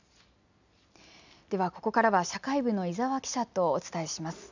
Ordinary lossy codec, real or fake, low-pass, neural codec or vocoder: none; real; 7.2 kHz; none